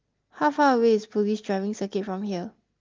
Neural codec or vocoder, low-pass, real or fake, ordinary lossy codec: none; 7.2 kHz; real; Opus, 32 kbps